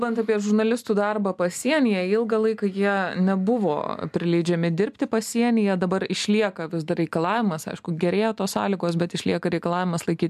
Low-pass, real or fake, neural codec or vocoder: 14.4 kHz; real; none